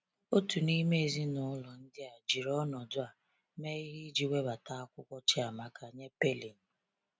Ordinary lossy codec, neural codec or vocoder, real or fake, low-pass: none; none; real; none